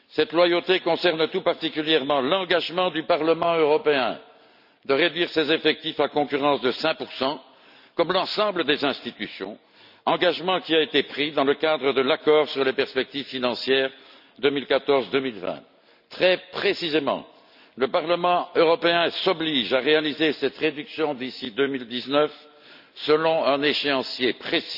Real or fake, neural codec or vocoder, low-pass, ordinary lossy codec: real; none; 5.4 kHz; none